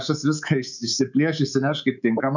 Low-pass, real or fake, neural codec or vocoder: 7.2 kHz; fake; codec, 24 kHz, 3.1 kbps, DualCodec